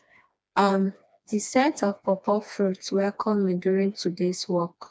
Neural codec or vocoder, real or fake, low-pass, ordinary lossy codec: codec, 16 kHz, 2 kbps, FreqCodec, smaller model; fake; none; none